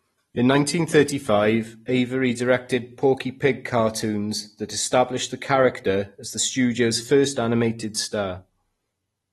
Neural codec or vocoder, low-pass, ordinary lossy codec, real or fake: none; 19.8 kHz; AAC, 32 kbps; real